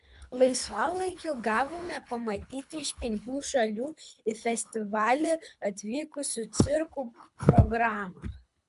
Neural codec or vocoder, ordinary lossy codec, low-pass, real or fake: codec, 24 kHz, 3 kbps, HILCodec; AAC, 96 kbps; 10.8 kHz; fake